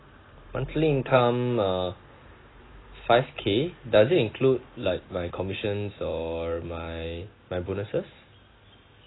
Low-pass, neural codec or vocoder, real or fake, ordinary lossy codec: 7.2 kHz; none; real; AAC, 16 kbps